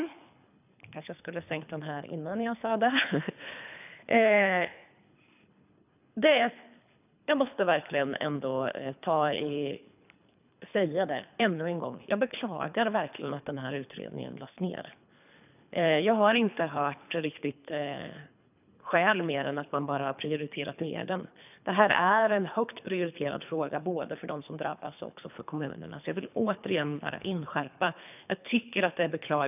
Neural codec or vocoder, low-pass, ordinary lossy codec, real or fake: codec, 24 kHz, 3 kbps, HILCodec; 3.6 kHz; none; fake